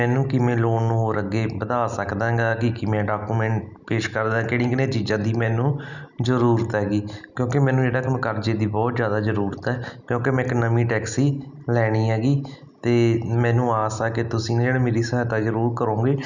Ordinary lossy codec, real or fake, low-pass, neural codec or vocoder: none; real; 7.2 kHz; none